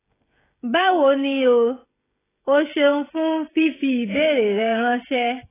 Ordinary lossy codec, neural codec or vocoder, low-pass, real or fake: AAC, 16 kbps; codec, 16 kHz, 16 kbps, FreqCodec, smaller model; 3.6 kHz; fake